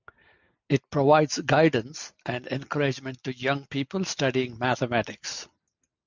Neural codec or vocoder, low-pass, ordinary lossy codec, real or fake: none; 7.2 kHz; MP3, 64 kbps; real